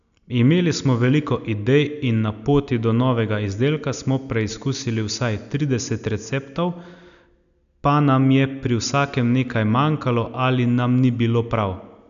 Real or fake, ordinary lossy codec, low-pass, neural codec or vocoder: real; none; 7.2 kHz; none